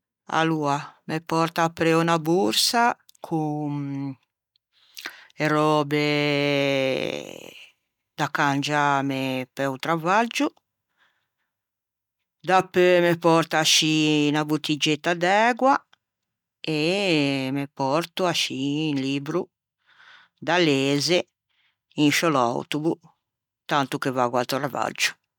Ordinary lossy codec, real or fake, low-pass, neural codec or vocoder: none; real; 19.8 kHz; none